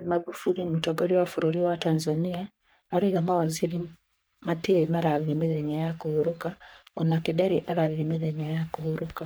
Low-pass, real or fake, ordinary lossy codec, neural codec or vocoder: none; fake; none; codec, 44.1 kHz, 3.4 kbps, Pupu-Codec